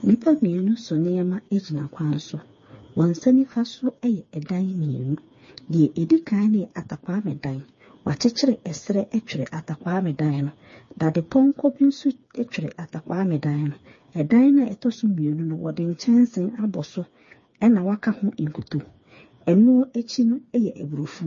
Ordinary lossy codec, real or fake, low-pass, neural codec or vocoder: MP3, 32 kbps; fake; 7.2 kHz; codec, 16 kHz, 4 kbps, FreqCodec, smaller model